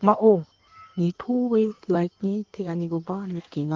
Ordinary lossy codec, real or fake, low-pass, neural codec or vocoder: Opus, 16 kbps; fake; 7.2 kHz; codec, 16 kHz in and 24 kHz out, 1.1 kbps, FireRedTTS-2 codec